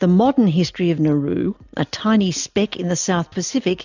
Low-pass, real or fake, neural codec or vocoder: 7.2 kHz; real; none